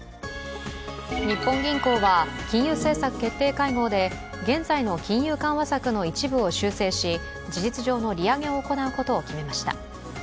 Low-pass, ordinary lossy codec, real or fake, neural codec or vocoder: none; none; real; none